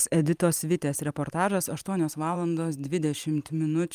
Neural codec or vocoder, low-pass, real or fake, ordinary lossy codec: vocoder, 44.1 kHz, 128 mel bands every 512 samples, BigVGAN v2; 14.4 kHz; fake; Opus, 32 kbps